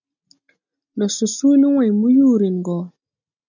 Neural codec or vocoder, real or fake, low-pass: none; real; 7.2 kHz